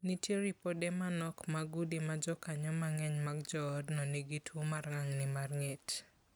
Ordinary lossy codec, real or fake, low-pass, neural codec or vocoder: none; real; none; none